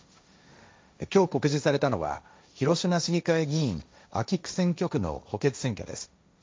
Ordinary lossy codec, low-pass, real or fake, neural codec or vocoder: none; none; fake; codec, 16 kHz, 1.1 kbps, Voila-Tokenizer